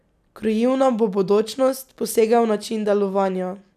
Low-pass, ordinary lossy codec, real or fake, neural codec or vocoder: 14.4 kHz; none; real; none